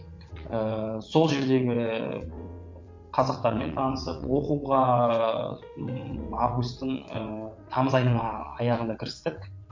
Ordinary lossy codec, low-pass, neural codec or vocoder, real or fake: MP3, 48 kbps; 7.2 kHz; vocoder, 22.05 kHz, 80 mel bands, WaveNeXt; fake